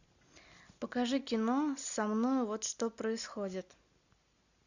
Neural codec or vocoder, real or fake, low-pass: none; real; 7.2 kHz